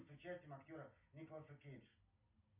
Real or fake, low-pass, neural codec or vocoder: real; 3.6 kHz; none